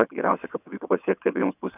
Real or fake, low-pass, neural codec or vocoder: fake; 3.6 kHz; vocoder, 22.05 kHz, 80 mel bands, WaveNeXt